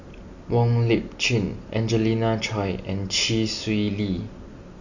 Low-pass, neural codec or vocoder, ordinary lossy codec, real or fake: 7.2 kHz; none; none; real